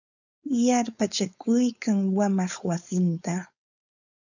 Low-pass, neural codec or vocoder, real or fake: 7.2 kHz; codec, 16 kHz, 4.8 kbps, FACodec; fake